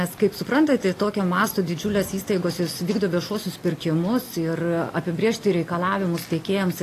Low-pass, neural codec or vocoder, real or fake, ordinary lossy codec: 14.4 kHz; none; real; AAC, 48 kbps